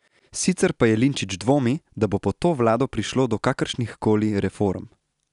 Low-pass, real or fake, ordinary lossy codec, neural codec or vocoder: 10.8 kHz; real; none; none